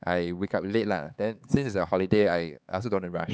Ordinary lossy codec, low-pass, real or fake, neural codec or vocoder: none; none; fake; codec, 16 kHz, 4 kbps, X-Codec, HuBERT features, trained on LibriSpeech